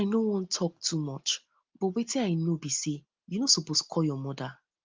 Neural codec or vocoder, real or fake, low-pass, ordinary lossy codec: none; real; 7.2 kHz; Opus, 32 kbps